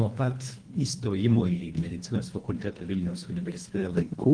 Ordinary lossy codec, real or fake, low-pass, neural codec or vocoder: Opus, 24 kbps; fake; 9.9 kHz; codec, 24 kHz, 1.5 kbps, HILCodec